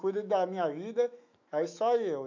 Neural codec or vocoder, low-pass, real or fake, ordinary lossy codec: autoencoder, 48 kHz, 128 numbers a frame, DAC-VAE, trained on Japanese speech; 7.2 kHz; fake; MP3, 48 kbps